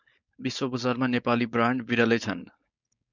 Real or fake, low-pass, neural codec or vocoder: fake; 7.2 kHz; codec, 16 kHz, 4.8 kbps, FACodec